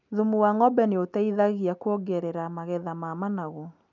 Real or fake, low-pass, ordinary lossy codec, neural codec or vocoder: real; 7.2 kHz; none; none